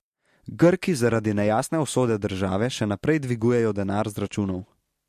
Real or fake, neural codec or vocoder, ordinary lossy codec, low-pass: real; none; MP3, 64 kbps; 14.4 kHz